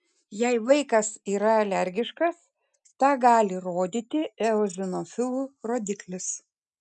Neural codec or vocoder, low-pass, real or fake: none; 10.8 kHz; real